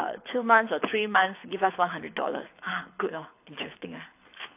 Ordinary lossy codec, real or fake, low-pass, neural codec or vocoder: AAC, 32 kbps; fake; 3.6 kHz; codec, 24 kHz, 6 kbps, HILCodec